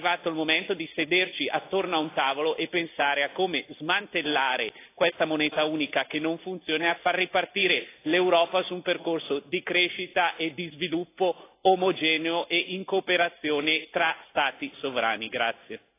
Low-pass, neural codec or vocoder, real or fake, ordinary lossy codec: 3.6 kHz; none; real; AAC, 24 kbps